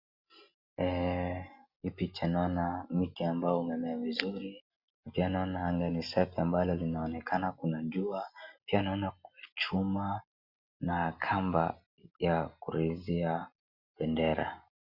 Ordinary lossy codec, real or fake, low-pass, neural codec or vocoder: Opus, 64 kbps; real; 5.4 kHz; none